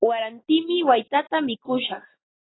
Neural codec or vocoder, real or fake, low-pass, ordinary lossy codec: none; real; 7.2 kHz; AAC, 16 kbps